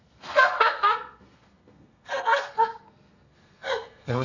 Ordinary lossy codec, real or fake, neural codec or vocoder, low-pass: none; fake; codec, 32 kHz, 1.9 kbps, SNAC; 7.2 kHz